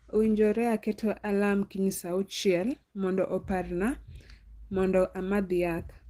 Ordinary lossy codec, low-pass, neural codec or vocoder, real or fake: Opus, 16 kbps; 14.4 kHz; none; real